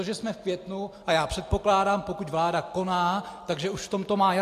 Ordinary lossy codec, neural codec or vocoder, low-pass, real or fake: AAC, 64 kbps; none; 14.4 kHz; real